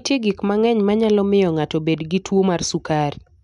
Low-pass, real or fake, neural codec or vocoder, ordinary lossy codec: 10.8 kHz; real; none; none